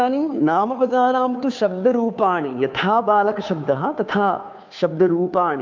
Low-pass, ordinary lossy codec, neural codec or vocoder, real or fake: 7.2 kHz; MP3, 64 kbps; codec, 16 kHz, 2 kbps, FunCodec, trained on Chinese and English, 25 frames a second; fake